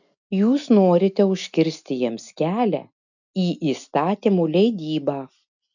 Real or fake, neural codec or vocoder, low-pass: real; none; 7.2 kHz